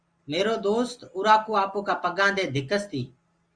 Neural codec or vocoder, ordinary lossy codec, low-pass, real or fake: none; Opus, 32 kbps; 9.9 kHz; real